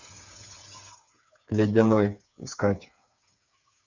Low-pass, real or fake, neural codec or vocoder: 7.2 kHz; fake; codec, 16 kHz, 8 kbps, FreqCodec, smaller model